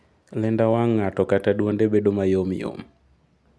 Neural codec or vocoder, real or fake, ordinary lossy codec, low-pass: none; real; none; none